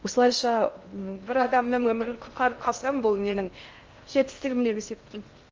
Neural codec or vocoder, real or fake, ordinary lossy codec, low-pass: codec, 16 kHz in and 24 kHz out, 0.6 kbps, FocalCodec, streaming, 2048 codes; fake; Opus, 24 kbps; 7.2 kHz